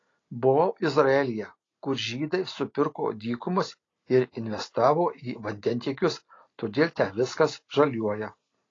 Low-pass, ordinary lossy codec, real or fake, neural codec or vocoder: 7.2 kHz; AAC, 32 kbps; real; none